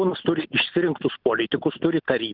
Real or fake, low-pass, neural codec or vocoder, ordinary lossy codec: real; 5.4 kHz; none; Opus, 32 kbps